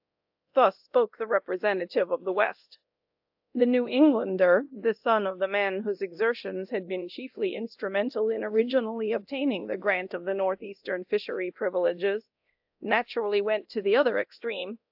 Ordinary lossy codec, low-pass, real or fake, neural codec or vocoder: AAC, 48 kbps; 5.4 kHz; fake; codec, 24 kHz, 0.9 kbps, DualCodec